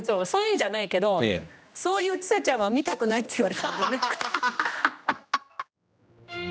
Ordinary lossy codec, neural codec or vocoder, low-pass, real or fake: none; codec, 16 kHz, 1 kbps, X-Codec, HuBERT features, trained on general audio; none; fake